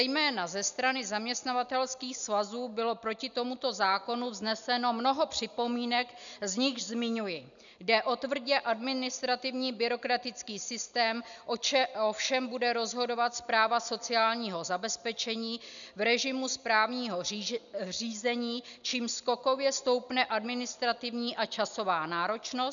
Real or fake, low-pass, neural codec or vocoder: real; 7.2 kHz; none